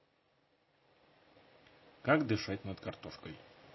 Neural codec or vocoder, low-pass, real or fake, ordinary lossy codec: none; 7.2 kHz; real; MP3, 24 kbps